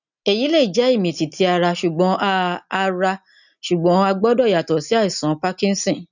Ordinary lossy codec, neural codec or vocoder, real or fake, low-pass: none; none; real; 7.2 kHz